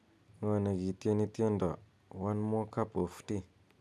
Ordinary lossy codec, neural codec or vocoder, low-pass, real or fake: none; none; none; real